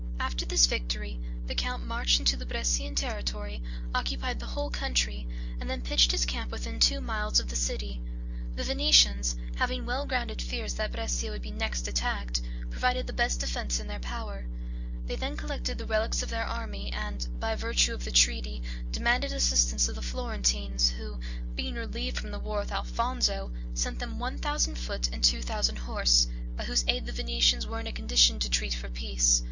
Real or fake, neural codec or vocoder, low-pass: real; none; 7.2 kHz